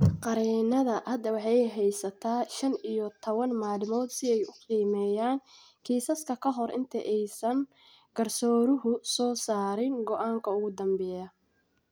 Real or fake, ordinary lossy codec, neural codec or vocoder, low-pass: real; none; none; none